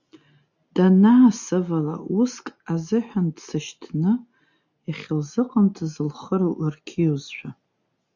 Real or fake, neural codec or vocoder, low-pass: real; none; 7.2 kHz